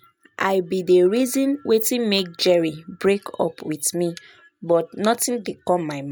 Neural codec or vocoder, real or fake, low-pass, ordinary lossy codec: none; real; none; none